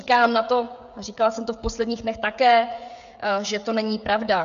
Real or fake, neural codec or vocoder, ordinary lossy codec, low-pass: fake; codec, 16 kHz, 16 kbps, FreqCodec, smaller model; AAC, 96 kbps; 7.2 kHz